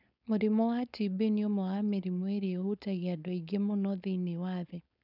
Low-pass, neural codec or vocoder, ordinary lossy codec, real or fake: 5.4 kHz; codec, 16 kHz, 4.8 kbps, FACodec; none; fake